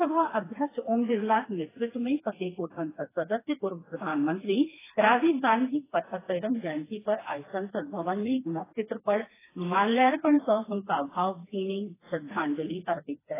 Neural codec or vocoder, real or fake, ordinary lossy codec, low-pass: codec, 16 kHz, 2 kbps, FreqCodec, smaller model; fake; AAC, 16 kbps; 3.6 kHz